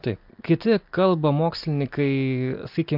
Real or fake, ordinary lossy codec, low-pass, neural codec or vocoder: real; MP3, 48 kbps; 5.4 kHz; none